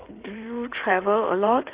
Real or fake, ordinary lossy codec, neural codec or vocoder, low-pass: fake; Opus, 32 kbps; codec, 16 kHz in and 24 kHz out, 2.2 kbps, FireRedTTS-2 codec; 3.6 kHz